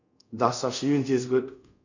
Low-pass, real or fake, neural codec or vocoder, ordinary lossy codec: 7.2 kHz; fake; codec, 24 kHz, 0.5 kbps, DualCodec; none